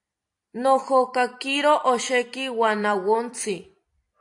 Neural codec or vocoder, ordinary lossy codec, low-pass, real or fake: vocoder, 44.1 kHz, 128 mel bands every 256 samples, BigVGAN v2; AAC, 64 kbps; 10.8 kHz; fake